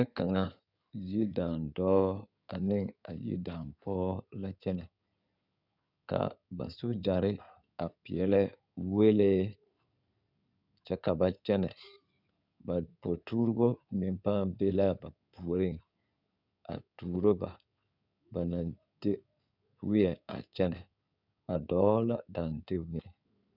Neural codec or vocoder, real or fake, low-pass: codec, 16 kHz in and 24 kHz out, 2.2 kbps, FireRedTTS-2 codec; fake; 5.4 kHz